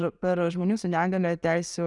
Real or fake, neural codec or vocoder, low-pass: real; none; 10.8 kHz